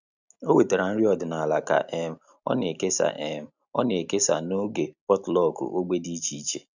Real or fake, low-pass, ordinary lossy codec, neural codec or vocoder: real; 7.2 kHz; none; none